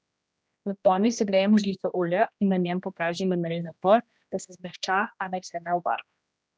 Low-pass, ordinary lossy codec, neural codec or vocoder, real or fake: none; none; codec, 16 kHz, 1 kbps, X-Codec, HuBERT features, trained on general audio; fake